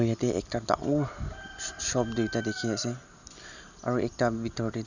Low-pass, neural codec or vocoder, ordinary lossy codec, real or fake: 7.2 kHz; none; none; real